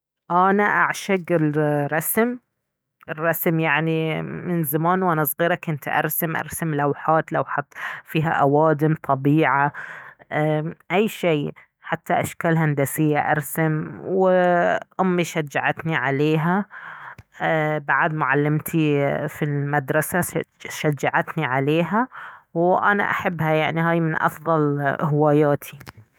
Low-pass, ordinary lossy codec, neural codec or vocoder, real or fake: none; none; autoencoder, 48 kHz, 128 numbers a frame, DAC-VAE, trained on Japanese speech; fake